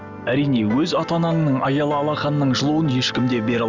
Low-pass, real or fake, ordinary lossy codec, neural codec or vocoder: 7.2 kHz; real; none; none